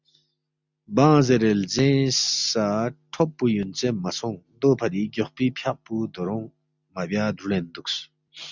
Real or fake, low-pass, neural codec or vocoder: real; 7.2 kHz; none